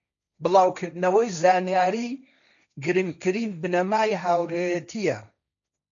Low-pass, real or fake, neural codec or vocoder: 7.2 kHz; fake; codec, 16 kHz, 1.1 kbps, Voila-Tokenizer